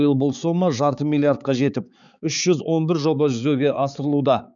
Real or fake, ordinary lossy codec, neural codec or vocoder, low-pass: fake; none; codec, 16 kHz, 4 kbps, X-Codec, HuBERT features, trained on balanced general audio; 7.2 kHz